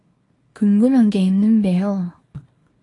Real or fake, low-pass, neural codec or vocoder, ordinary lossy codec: fake; 10.8 kHz; codec, 24 kHz, 0.9 kbps, WavTokenizer, small release; AAC, 32 kbps